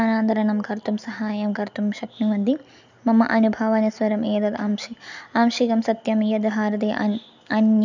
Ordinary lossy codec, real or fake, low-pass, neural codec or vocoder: none; real; 7.2 kHz; none